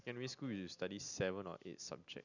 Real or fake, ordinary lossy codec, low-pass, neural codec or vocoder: real; none; 7.2 kHz; none